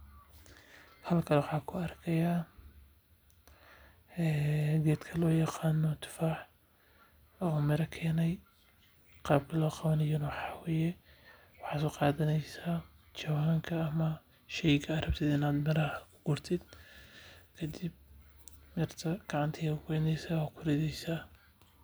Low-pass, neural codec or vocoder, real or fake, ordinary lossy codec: none; none; real; none